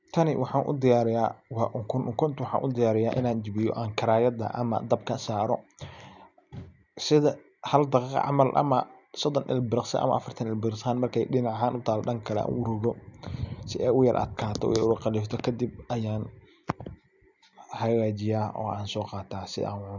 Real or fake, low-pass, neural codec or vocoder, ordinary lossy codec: real; 7.2 kHz; none; none